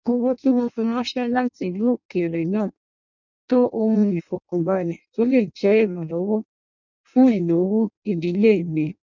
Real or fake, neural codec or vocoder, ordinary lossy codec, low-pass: fake; codec, 16 kHz in and 24 kHz out, 0.6 kbps, FireRedTTS-2 codec; none; 7.2 kHz